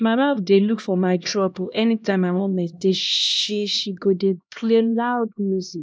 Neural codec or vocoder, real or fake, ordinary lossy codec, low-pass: codec, 16 kHz, 1 kbps, X-Codec, HuBERT features, trained on LibriSpeech; fake; none; none